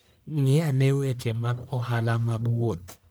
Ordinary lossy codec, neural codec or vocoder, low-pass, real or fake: none; codec, 44.1 kHz, 1.7 kbps, Pupu-Codec; none; fake